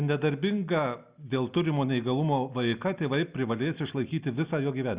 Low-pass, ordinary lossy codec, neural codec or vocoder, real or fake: 3.6 kHz; Opus, 64 kbps; none; real